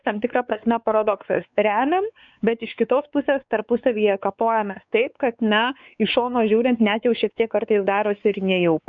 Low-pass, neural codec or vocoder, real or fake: 7.2 kHz; codec, 16 kHz, 4 kbps, X-Codec, WavLM features, trained on Multilingual LibriSpeech; fake